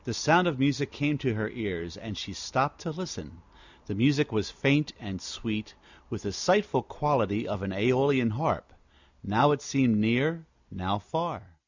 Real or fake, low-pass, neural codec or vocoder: real; 7.2 kHz; none